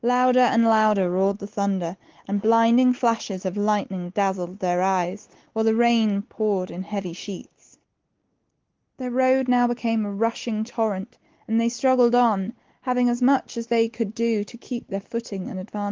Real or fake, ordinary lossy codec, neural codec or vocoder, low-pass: real; Opus, 16 kbps; none; 7.2 kHz